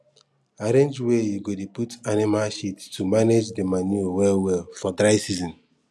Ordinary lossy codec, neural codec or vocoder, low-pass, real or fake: none; none; none; real